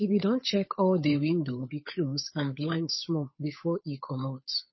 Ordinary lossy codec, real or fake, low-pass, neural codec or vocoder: MP3, 24 kbps; fake; 7.2 kHz; codec, 16 kHz, 8 kbps, FunCodec, trained on Chinese and English, 25 frames a second